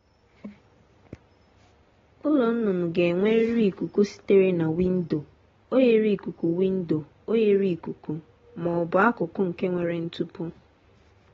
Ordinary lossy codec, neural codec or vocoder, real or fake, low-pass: AAC, 24 kbps; none; real; 7.2 kHz